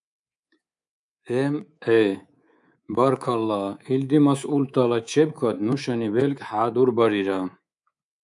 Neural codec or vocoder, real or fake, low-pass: codec, 24 kHz, 3.1 kbps, DualCodec; fake; 10.8 kHz